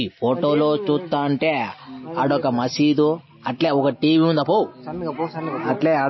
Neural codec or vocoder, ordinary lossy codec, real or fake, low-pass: none; MP3, 24 kbps; real; 7.2 kHz